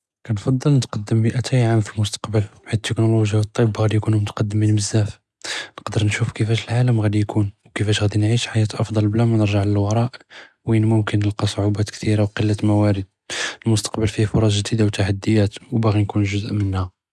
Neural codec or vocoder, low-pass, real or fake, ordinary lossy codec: none; none; real; none